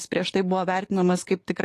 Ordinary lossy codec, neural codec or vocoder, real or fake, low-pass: AAC, 48 kbps; codec, 44.1 kHz, 7.8 kbps, DAC; fake; 14.4 kHz